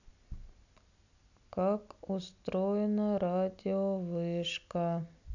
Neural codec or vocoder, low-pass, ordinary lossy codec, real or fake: none; 7.2 kHz; none; real